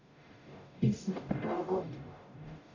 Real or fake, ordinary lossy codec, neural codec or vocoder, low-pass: fake; none; codec, 44.1 kHz, 0.9 kbps, DAC; 7.2 kHz